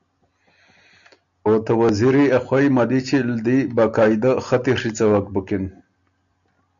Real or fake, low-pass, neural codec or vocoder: real; 7.2 kHz; none